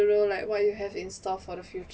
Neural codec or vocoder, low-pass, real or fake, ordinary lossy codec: none; none; real; none